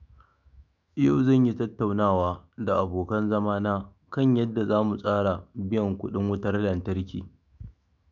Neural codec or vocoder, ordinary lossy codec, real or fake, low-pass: autoencoder, 48 kHz, 128 numbers a frame, DAC-VAE, trained on Japanese speech; none; fake; 7.2 kHz